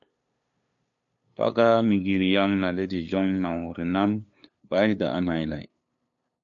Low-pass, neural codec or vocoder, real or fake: 7.2 kHz; codec, 16 kHz, 2 kbps, FunCodec, trained on LibriTTS, 25 frames a second; fake